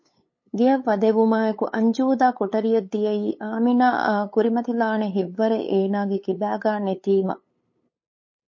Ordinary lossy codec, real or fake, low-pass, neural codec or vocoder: MP3, 32 kbps; fake; 7.2 kHz; codec, 16 kHz, 8 kbps, FunCodec, trained on LibriTTS, 25 frames a second